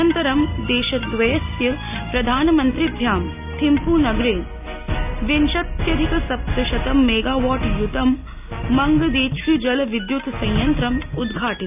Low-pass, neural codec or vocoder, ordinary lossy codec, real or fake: 3.6 kHz; none; none; real